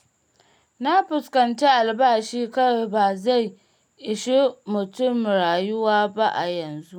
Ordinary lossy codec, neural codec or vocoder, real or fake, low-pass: none; none; real; 19.8 kHz